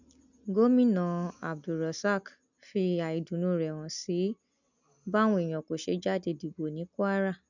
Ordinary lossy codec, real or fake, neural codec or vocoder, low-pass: none; real; none; 7.2 kHz